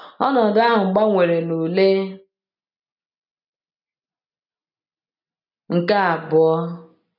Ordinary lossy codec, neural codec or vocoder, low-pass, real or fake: none; none; 5.4 kHz; real